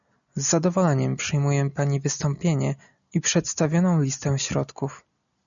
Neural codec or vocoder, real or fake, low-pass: none; real; 7.2 kHz